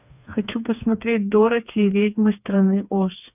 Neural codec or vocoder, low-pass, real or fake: codec, 16 kHz, 4 kbps, FreqCodec, smaller model; 3.6 kHz; fake